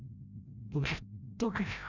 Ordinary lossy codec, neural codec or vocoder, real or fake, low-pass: none; codec, 16 kHz, 0.5 kbps, FreqCodec, larger model; fake; 7.2 kHz